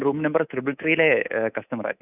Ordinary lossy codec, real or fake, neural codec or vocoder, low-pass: none; fake; codec, 24 kHz, 3.1 kbps, DualCodec; 3.6 kHz